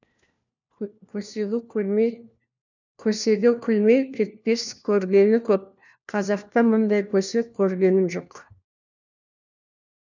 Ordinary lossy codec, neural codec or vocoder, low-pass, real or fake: none; codec, 16 kHz, 1 kbps, FunCodec, trained on LibriTTS, 50 frames a second; 7.2 kHz; fake